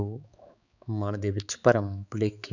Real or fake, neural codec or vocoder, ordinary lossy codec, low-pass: fake; codec, 16 kHz, 4 kbps, X-Codec, HuBERT features, trained on balanced general audio; none; 7.2 kHz